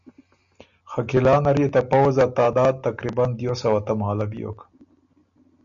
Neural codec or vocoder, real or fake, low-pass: none; real; 7.2 kHz